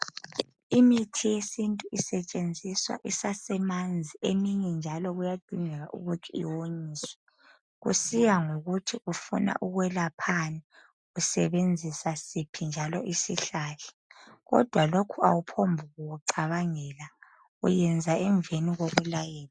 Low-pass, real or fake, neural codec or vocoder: 9.9 kHz; real; none